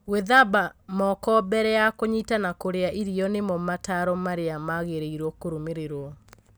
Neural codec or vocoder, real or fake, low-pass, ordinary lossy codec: none; real; none; none